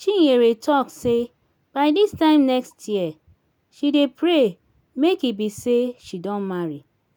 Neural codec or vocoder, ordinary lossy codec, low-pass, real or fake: none; none; none; real